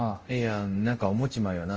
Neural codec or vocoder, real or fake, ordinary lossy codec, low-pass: codec, 24 kHz, 0.5 kbps, DualCodec; fake; Opus, 24 kbps; 7.2 kHz